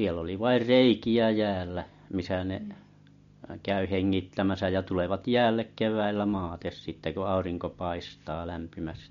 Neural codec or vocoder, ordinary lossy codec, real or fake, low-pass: none; MP3, 48 kbps; real; 7.2 kHz